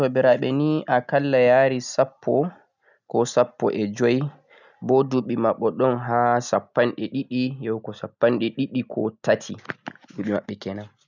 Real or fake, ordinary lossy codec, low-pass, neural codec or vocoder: real; none; 7.2 kHz; none